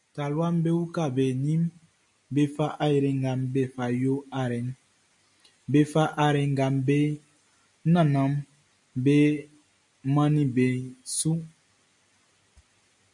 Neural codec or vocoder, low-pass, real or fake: none; 10.8 kHz; real